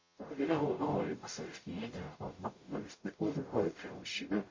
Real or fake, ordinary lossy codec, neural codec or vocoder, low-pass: fake; MP3, 32 kbps; codec, 44.1 kHz, 0.9 kbps, DAC; 7.2 kHz